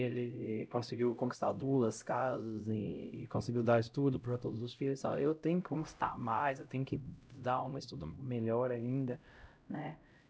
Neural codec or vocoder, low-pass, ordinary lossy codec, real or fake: codec, 16 kHz, 0.5 kbps, X-Codec, HuBERT features, trained on LibriSpeech; none; none; fake